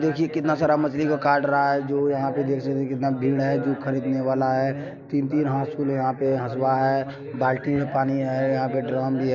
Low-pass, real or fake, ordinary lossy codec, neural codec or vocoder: 7.2 kHz; real; MP3, 64 kbps; none